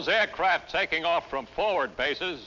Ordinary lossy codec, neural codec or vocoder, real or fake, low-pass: MP3, 64 kbps; none; real; 7.2 kHz